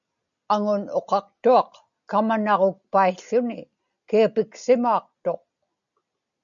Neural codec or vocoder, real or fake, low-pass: none; real; 7.2 kHz